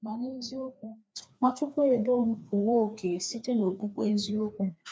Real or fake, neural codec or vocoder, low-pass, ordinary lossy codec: fake; codec, 16 kHz, 2 kbps, FreqCodec, larger model; none; none